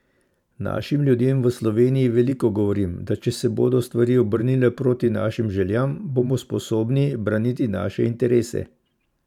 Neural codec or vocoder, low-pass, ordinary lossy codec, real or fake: vocoder, 44.1 kHz, 128 mel bands every 512 samples, BigVGAN v2; 19.8 kHz; none; fake